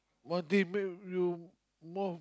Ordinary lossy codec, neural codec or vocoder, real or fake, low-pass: none; none; real; none